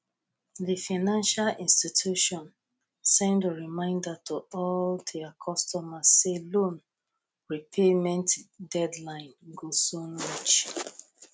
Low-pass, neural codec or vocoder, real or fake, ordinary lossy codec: none; none; real; none